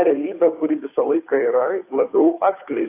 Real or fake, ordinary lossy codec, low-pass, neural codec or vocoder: fake; AAC, 24 kbps; 3.6 kHz; codec, 16 kHz, 2 kbps, FunCodec, trained on Chinese and English, 25 frames a second